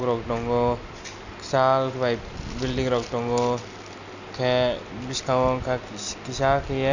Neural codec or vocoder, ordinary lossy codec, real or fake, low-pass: none; none; real; 7.2 kHz